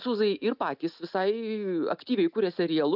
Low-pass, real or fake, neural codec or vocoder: 5.4 kHz; real; none